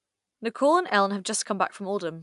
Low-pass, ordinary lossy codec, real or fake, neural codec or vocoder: 10.8 kHz; AAC, 96 kbps; real; none